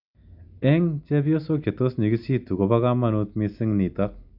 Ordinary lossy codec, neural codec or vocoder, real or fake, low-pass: none; none; real; 5.4 kHz